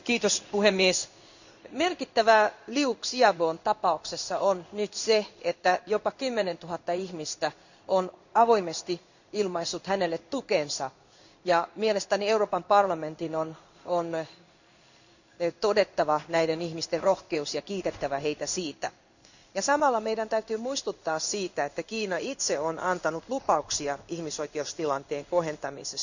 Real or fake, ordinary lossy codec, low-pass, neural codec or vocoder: fake; none; 7.2 kHz; codec, 16 kHz in and 24 kHz out, 1 kbps, XY-Tokenizer